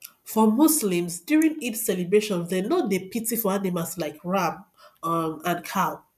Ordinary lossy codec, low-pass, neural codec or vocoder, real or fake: none; 14.4 kHz; none; real